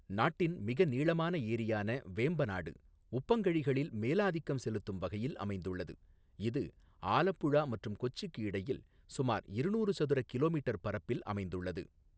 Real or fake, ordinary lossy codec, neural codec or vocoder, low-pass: real; none; none; none